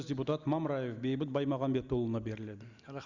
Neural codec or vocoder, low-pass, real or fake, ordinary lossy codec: none; 7.2 kHz; real; Opus, 64 kbps